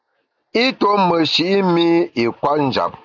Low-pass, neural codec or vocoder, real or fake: 7.2 kHz; none; real